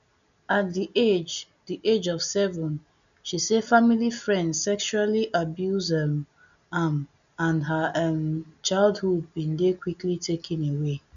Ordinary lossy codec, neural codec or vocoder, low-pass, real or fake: none; none; 7.2 kHz; real